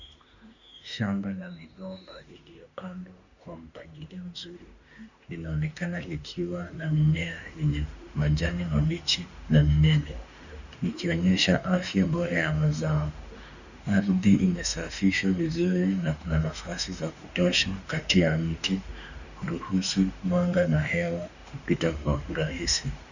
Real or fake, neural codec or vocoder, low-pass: fake; autoencoder, 48 kHz, 32 numbers a frame, DAC-VAE, trained on Japanese speech; 7.2 kHz